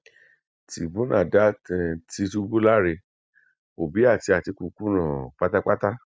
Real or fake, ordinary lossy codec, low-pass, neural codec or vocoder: real; none; none; none